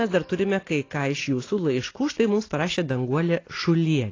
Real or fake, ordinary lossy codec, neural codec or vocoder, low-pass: real; AAC, 32 kbps; none; 7.2 kHz